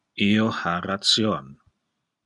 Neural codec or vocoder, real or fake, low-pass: none; real; 10.8 kHz